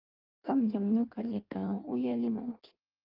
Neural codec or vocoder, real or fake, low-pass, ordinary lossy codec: codec, 16 kHz in and 24 kHz out, 1.1 kbps, FireRedTTS-2 codec; fake; 5.4 kHz; Opus, 32 kbps